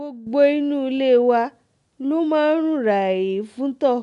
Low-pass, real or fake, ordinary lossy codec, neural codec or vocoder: 10.8 kHz; real; none; none